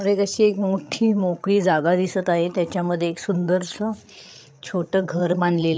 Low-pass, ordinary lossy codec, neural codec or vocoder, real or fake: none; none; codec, 16 kHz, 8 kbps, FreqCodec, larger model; fake